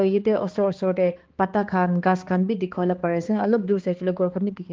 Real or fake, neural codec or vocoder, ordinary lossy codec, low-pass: fake; codec, 16 kHz, 2 kbps, X-Codec, HuBERT features, trained on balanced general audio; Opus, 16 kbps; 7.2 kHz